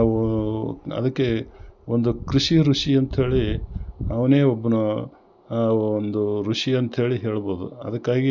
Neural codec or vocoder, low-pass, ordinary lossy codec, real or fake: none; 7.2 kHz; none; real